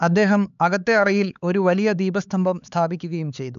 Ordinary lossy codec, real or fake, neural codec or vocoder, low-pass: none; fake; codec, 16 kHz, 4 kbps, X-Codec, HuBERT features, trained on LibriSpeech; 7.2 kHz